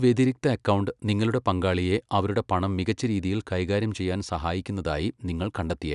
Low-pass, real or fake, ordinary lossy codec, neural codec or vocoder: 10.8 kHz; real; none; none